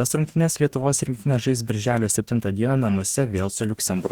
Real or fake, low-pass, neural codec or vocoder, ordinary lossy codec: fake; 19.8 kHz; codec, 44.1 kHz, 2.6 kbps, DAC; Opus, 64 kbps